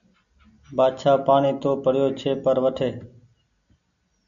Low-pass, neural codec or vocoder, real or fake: 7.2 kHz; none; real